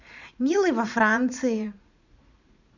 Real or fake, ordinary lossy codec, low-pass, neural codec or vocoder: fake; none; 7.2 kHz; vocoder, 44.1 kHz, 80 mel bands, Vocos